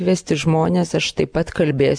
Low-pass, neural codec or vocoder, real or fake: 9.9 kHz; none; real